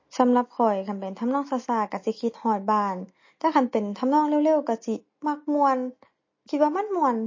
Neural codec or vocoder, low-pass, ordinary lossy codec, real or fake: none; 7.2 kHz; MP3, 32 kbps; real